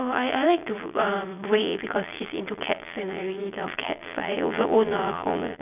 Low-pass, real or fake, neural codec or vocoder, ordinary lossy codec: 3.6 kHz; fake; vocoder, 22.05 kHz, 80 mel bands, Vocos; none